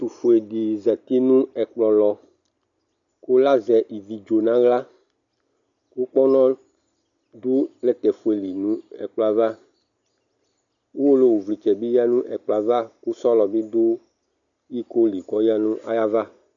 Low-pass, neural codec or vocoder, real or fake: 7.2 kHz; none; real